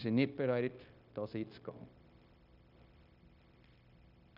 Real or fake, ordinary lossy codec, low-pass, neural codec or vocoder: fake; none; 5.4 kHz; codec, 16 kHz, 0.9 kbps, LongCat-Audio-Codec